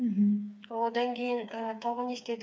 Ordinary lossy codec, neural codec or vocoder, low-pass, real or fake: none; codec, 16 kHz, 4 kbps, FreqCodec, smaller model; none; fake